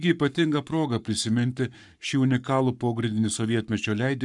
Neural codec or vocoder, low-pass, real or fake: codec, 44.1 kHz, 7.8 kbps, Pupu-Codec; 10.8 kHz; fake